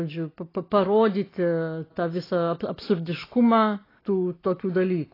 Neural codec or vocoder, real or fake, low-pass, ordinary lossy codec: none; real; 5.4 kHz; AAC, 24 kbps